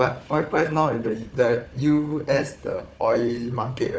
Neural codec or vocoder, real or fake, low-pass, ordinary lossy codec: codec, 16 kHz, 4 kbps, FunCodec, trained on Chinese and English, 50 frames a second; fake; none; none